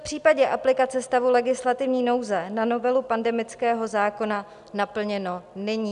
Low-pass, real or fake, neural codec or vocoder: 10.8 kHz; real; none